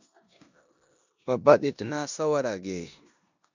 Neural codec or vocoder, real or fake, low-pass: codec, 16 kHz in and 24 kHz out, 0.9 kbps, LongCat-Audio-Codec, four codebook decoder; fake; 7.2 kHz